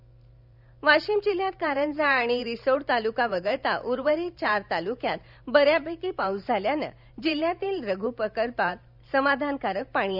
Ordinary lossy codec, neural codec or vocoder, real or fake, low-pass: none; vocoder, 44.1 kHz, 128 mel bands every 512 samples, BigVGAN v2; fake; 5.4 kHz